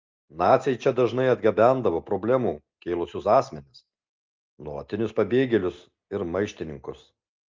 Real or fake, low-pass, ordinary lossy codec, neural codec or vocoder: real; 7.2 kHz; Opus, 24 kbps; none